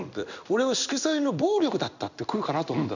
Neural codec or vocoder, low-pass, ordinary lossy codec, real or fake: codec, 16 kHz in and 24 kHz out, 1 kbps, XY-Tokenizer; 7.2 kHz; none; fake